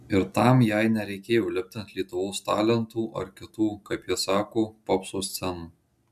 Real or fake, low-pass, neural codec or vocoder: real; 14.4 kHz; none